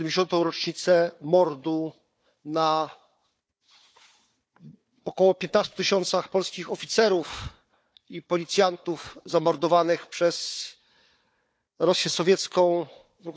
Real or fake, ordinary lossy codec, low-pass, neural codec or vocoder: fake; none; none; codec, 16 kHz, 4 kbps, FunCodec, trained on Chinese and English, 50 frames a second